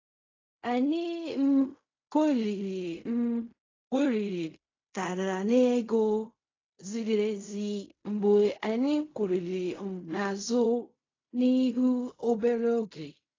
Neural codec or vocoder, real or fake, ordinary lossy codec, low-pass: codec, 16 kHz in and 24 kHz out, 0.4 kbps, LongCat-Audio-Codec, fine tuned four codebook decoder; fake; AAC, 32 kbps; 7.2 kHz